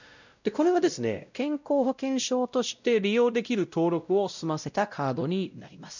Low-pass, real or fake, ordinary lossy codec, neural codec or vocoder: 7.2 kHz; fake; none; codec, 16 kHz, 0.5 kbps, X-Codec, WavLM features, trained on Multilingual LibriSpeech